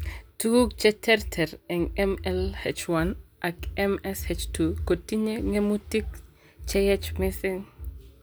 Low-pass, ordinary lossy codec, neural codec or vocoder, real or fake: none; none; none; real